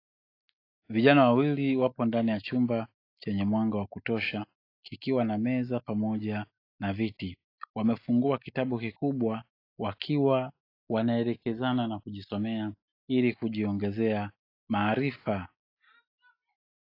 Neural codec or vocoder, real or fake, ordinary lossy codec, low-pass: none; real; AAC, 32 kbps; 5.4 kHz